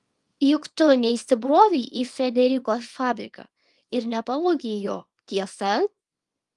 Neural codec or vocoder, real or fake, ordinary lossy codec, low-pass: codec, 24 kHz, 0.9 kbps, WavTokenizer, small release; fake; Opus, 32 kbps; 10.8 kHz